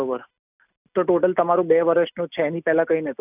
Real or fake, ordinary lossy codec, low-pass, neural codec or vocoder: real; none; 3.6 kHz; none